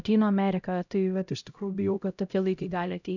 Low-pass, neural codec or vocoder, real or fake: 7.2 kHz; codec, 16 kHz, 0.5 kbps, X-Codec, HuBERT features, trained on LibriSpeech; fake